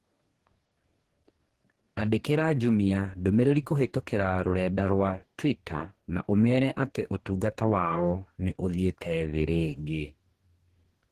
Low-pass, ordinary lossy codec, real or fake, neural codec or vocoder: 14.4 kHz; Opus, 16 kbps; fake; codec, 44.1 kHz, 2.6 kbps, DAC